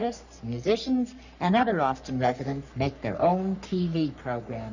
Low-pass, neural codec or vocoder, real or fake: 7.2 kHz; codec, 44.1 kHz, 3.4 kbps, Pupu-Codec; fake